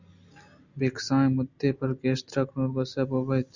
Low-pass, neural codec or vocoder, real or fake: 7.2 kHz; none; real